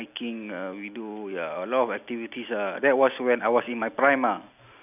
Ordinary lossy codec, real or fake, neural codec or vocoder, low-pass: none; real; none; 3.6 kHz